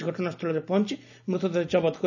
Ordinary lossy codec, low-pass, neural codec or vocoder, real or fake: none; 7.2 kHz; none; real